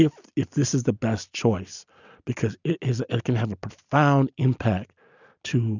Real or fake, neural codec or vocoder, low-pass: real; none; 7.2 kHz